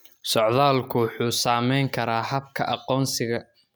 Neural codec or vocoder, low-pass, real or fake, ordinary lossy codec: none; none; real; none